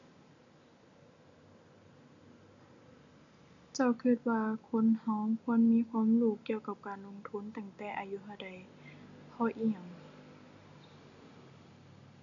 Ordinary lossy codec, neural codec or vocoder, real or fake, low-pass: none; none; real; 7.2 kHz